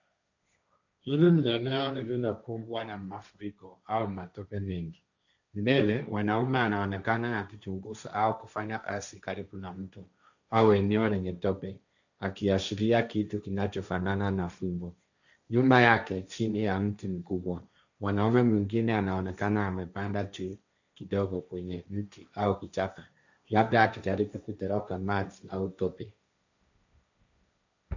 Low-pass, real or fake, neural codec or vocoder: 7.2 kHz; fake; codec, 16 kHz, 1.1 kbps, Voila-Tokenizer